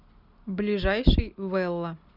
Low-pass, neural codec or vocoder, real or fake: 5.4 kHz; none; real